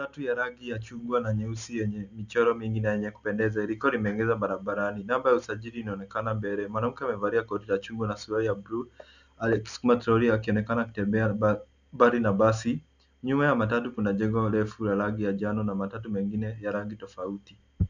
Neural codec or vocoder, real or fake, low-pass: none; real; 7.2 kHz